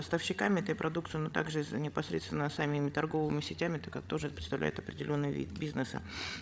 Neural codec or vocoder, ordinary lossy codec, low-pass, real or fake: codec, 16 kHz, 16 kbps, FreqCodec, larger model; none; none; fake